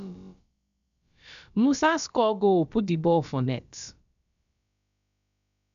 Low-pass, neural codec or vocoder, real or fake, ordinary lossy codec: 7.2 kHz; codec, 16 kHz, about 1 kbps, DyCAST, with the encoder's durations; fake; none